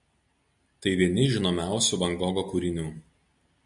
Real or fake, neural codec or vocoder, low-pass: real; none; 10.8 kHz